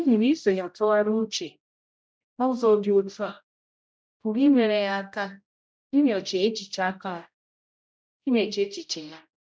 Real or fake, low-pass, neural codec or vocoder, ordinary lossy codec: fake; none; codec, 16 kHz, 0.5 kbps, X-Codec, HuBERT features, trained on general audio; none